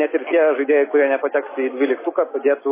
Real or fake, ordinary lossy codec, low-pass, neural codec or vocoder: real; MP3, 16 kbps; 3.6 kHz; none